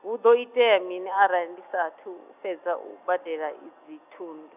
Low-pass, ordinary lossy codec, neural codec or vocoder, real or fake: 3.6 kHz; none; none; real